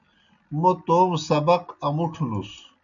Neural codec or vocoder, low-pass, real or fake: none; 7.2 kHz; real